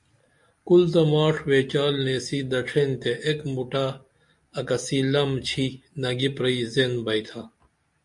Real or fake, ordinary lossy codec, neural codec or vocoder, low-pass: real; MP3, 64 kbps; none; 10.8 kHz